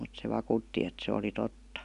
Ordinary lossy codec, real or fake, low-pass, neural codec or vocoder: none; real; 10.8 kHz; none